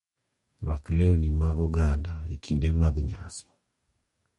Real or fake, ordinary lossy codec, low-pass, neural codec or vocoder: fake; MP3, 48 kbps; 19.8 kHz; codec, 44.1 kHz, 2.6 kbps, DAC